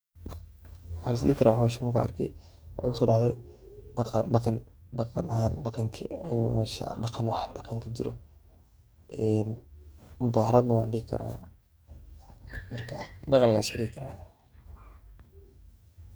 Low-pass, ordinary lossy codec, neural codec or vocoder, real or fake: none; none; codec, 44.1 kHz, 2.6 kbps, DAC; fake